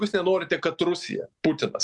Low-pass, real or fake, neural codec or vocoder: 10.8 kHz; real; none